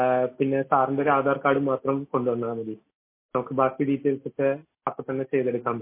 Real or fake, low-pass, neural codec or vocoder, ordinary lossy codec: real; 3.6 kHz; none; MP3, 24 kbps